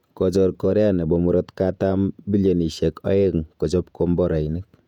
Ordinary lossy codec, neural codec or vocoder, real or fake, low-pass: none; vocoder, 48 kHz, 128 mel bands, Vocos; fake; 19.8 kHz